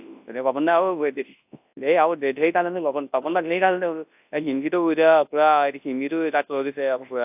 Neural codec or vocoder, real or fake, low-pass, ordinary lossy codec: codec, 24 kHz, 0.9 kbps, WavTokenizer, large speech release; fake; 3.6 kHz; AAC, 32 kbps